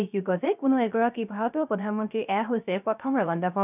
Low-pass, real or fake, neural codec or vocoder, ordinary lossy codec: 3.6 kHz; fake; codec, 16 kHz, 0.3 kbps, FocalCodec; none